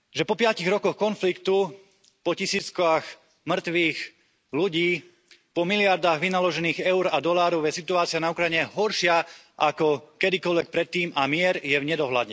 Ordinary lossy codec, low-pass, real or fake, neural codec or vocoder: none; none; real; none